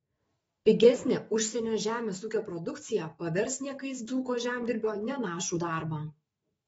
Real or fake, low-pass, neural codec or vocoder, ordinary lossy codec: fake; 19.8 kHz; autoencoder, 48 kHz, 128 numbers a frame, DAC-VAE, trained on Japanese speech; AAC, 24 kbps